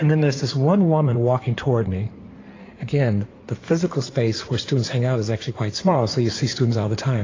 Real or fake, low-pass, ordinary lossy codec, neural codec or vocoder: fake; 7.2 kHz; AAC, 32 kbps; codec, 16 kHz in and 24 kHz out, 2.2 kbps, FireRedTTS-2 codec